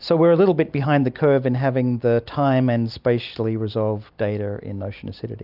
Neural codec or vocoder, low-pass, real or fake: none; 5.4 kHz; real